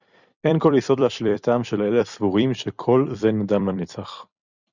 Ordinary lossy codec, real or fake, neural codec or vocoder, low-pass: Opus, 64 kbps; fake; vocoder, 44.1 kHz, 128 mel bands every 256 samples, BigVGAN v2; 7.2 kHz